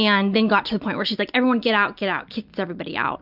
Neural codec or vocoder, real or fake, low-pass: none; real; 5.4 kHz